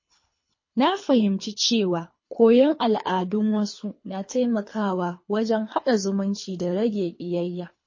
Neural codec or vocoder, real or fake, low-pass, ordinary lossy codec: codec, 24 kHz, 3 kbps, HILCodec; fake; 7.2 kHz; MP3, 32 kbps